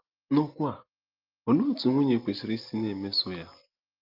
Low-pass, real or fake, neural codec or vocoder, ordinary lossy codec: 5.4 kHz; real; none; Opus, 32 kbps